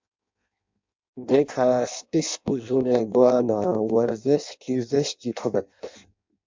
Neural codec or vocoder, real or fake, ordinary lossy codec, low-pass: codec, 16 kHz in and 24 kHz out, 0.6 kbps, FireRedTTS-2 codec; fake; MP3, 64 kbps; 7.2 kHz